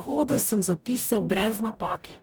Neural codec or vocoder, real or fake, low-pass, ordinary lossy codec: codec, 44.1 kHz, 0.9 kbps, DAC; fake; none; none